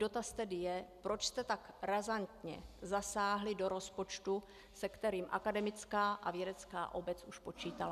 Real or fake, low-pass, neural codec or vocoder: real; 14.4 kHz; none